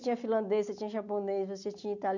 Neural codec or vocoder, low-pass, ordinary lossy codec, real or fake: none; 7.2 kHz; none; real